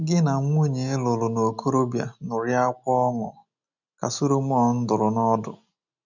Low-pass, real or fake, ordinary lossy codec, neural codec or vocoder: 7.2 kHz; real; none; none